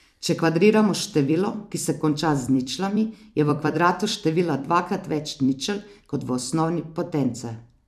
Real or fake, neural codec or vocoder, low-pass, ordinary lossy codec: fake; vocoder, 44.1 kHz, 128 mel bands every 256 samples, BigVGAN v2; 14.4 kHz; none